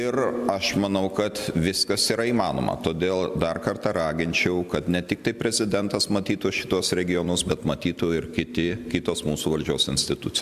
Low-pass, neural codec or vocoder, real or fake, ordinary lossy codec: 14.4 kHz; none; real; Opus, 64 kbps